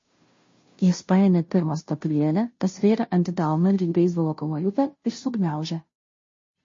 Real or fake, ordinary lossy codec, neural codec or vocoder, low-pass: fake; MP3, 32 kbps; codec, 16 kHz, 0.5 kbps, FunCodec, trained on Chinese and English, 25 frames a second; 7.2 kHz